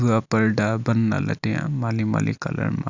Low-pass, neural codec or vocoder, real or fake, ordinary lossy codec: 7.2 kHz; none; real; none